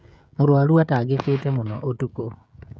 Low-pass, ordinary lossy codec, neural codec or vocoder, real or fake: none; none; codec, 16 kHz, 16 kbps, FreqCodec, smaller model; fake